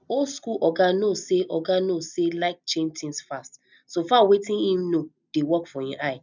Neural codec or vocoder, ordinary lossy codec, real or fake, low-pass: none; none; real; 7.2 kHz